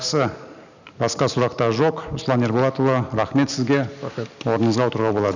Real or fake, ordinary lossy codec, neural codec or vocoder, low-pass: real; none; none; 7.2 kHz